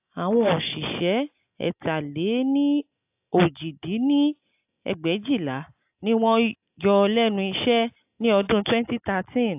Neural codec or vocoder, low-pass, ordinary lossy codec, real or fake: none; 3.6 kHz; AAC, 32 kbps; real